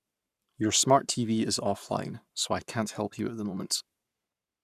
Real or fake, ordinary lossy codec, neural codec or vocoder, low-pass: fake; none; codec, 44.1 kHz, 7.8 kbps, Pupu-Codec; 14.4 kHz